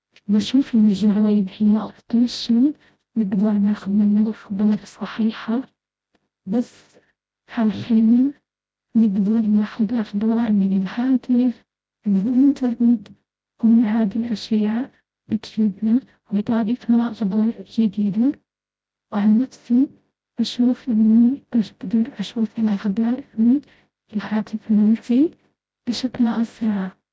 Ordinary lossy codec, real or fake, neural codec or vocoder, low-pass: none; fake; codec, 16 kHz, 0.5 kbps, FreqCodec, smaller model; none